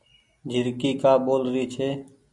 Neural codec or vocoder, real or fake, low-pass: none; real; 10.8 kHz